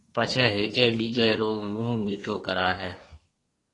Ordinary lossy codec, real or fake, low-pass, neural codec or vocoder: AAC, 32 kbps; fake; 10.8 kHz; codec, 24 kHz, 1 kbps, SNAC